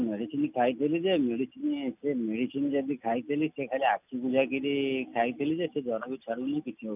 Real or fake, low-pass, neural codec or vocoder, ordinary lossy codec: real; 3.6 kHz; none; none